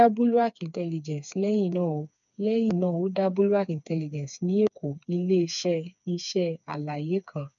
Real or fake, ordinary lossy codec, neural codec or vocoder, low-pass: fake; none; codec, 16 kHz, 4 kbps, FreqCodec, smaller model; 7.2 kHz